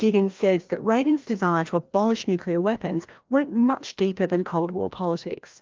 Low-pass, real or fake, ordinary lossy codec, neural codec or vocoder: 7.2 kHz; fake; Opus, 24 kbps; codec, 16 kHz, 1 kbps, FreqCodec, larger model